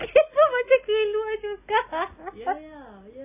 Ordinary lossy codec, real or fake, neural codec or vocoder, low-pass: none; real; none; 3.6 kHz